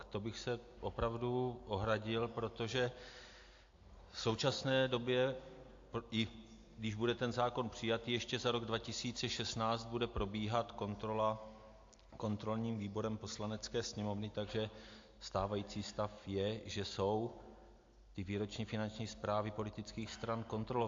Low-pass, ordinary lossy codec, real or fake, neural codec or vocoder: 7.2 kHz; AAC, 48 kbps; real; none